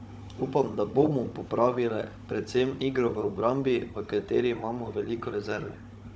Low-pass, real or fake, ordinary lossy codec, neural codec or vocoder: none; fake; none; codec, 16 kHz, 16 kbps, FunCodec, trained on Chinese and English, 50 frames a second